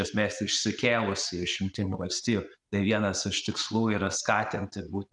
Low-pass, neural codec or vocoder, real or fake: 10.8 kHz; none; real